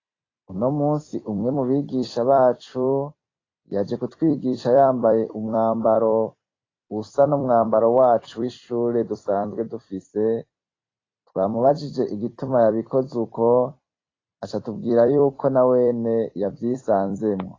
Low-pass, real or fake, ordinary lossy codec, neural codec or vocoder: 7.2 kHz; fake; AAC, 32 kbps; vocoder, 44.1 kHz, 128 mel bands every 256 samples, BigVGAN v2